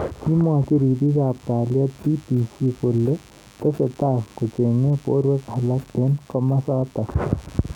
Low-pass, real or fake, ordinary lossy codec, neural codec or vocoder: 19.8 kHz; real; none; none